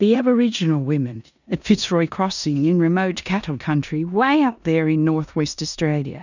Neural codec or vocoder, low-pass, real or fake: codec, 16 kHz in and 24 kHz out, 0.9 kbps, LongCat-Audio-Codec, four codebook decoder; 7.2 kHz; fake